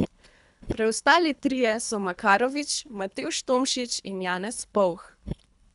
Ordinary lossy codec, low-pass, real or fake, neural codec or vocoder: none; 10.8 kHz; fake; codec, 24 kHz, 3 kbps, HILCodec